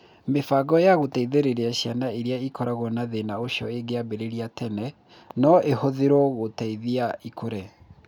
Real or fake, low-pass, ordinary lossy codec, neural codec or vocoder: real; 19.8 kHz; none; none